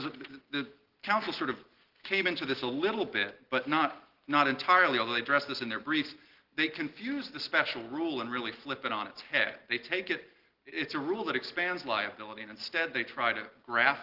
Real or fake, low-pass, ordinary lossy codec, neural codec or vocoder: real; 5.4 kHz; Opus, 16 kbps; none